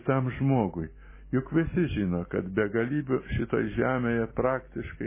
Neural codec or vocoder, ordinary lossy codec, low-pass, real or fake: none; MP3, 16 kbps; 3.6 kHz; real